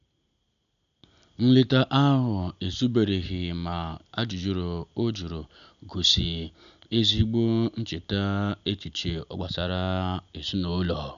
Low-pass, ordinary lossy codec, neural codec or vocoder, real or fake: 7.2 kHz; none; none; real